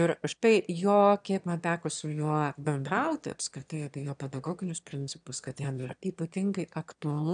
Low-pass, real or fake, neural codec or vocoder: 9.9 kHz; fake; autoencoder, 22.05 kHz, a latent of 192 numbers a frame, VITS, trained on one speaker